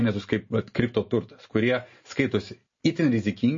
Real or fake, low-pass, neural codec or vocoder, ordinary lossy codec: real; 7.2 kHz; none; MP3, 32 kbps